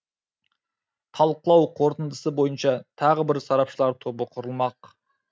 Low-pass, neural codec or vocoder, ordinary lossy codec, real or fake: none; none; none; real